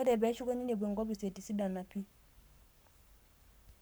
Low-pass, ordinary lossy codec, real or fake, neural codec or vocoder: none; none; fake; codec, 44.1 kHz, 7.8 kbps, Pupu-Codec